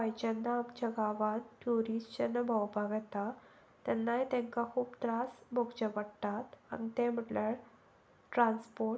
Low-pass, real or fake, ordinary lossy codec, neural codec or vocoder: none; real; none; none